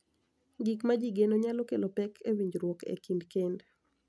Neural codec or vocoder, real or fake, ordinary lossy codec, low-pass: none; real; none; none